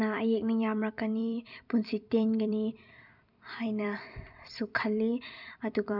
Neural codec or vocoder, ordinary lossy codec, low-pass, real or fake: vocoder, 44.1 kHz, 128 mel bands every 256 samples, BigVGAN v2; none; 5.4 kHz; fake